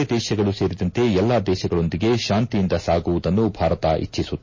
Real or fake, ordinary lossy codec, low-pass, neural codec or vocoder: real; MP3, 32 kbps; 7.2 kHz; none